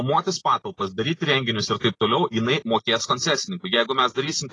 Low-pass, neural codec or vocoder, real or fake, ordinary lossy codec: 10.8 kHz; none; real; AAC, 32 kbps